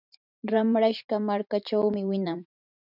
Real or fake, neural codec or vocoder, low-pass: fake; vocoder, 44.1 kHz, 128 mel bands every 512 samples, BigVGAN v2; 5.4 kHz